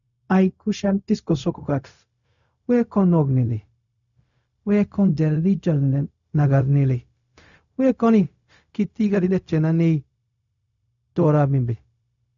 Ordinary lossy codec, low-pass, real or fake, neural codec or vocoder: none; 7.2 kHz; fake; codec, 16 kHz, 0.4 kbps, LongCat-Audio-Codec